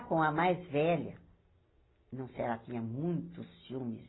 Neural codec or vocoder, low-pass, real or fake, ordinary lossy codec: none; 7.2 kHz; real; AAC, 16 kbps